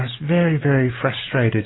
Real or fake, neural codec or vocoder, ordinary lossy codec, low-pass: real; none; AAC, 16 kbps; 7.2 kHz